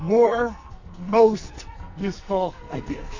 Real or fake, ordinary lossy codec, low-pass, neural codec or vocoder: fake; MP3, 48 kbps; 7.2 kHz; codec, 44.1 kHz, 2.6 kbps, SNAC